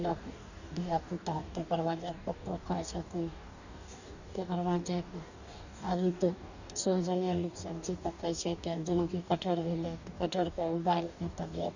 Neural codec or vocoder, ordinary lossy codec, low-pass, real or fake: codec, 44.1 kHz, 2.6 kbps, DAC; none; 7.2 kHz; fake